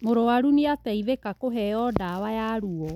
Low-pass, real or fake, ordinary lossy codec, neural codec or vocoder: 19.8 kHz; real; none; none